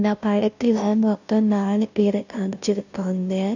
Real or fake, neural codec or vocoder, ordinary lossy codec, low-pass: fake; codec, 16 kHz, 0.5 kbps, FunCodec, trained on Chinese and English, 25 frames a second; none; 7.2 kHz